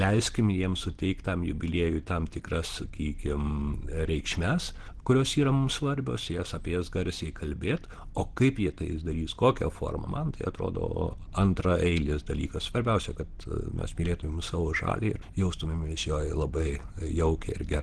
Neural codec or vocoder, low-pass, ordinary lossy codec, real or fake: none; 10.8 kHz; Opus, 16 kbps; real